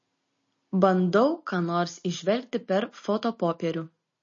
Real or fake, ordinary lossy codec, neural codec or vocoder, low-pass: real; MP3, 32 kbps; none; 7.2 kHz